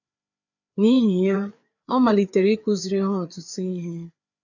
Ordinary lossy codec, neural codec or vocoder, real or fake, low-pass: none; codec, 16 kHz, 4 kbps, FreqCodec, larger model; fake; 7.2 kHz